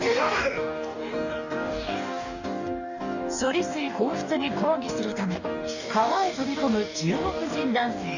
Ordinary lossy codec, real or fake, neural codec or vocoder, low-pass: none; fake; codec, 44.1 kHz, 2.6 kbps, DAC; 7.2 kHz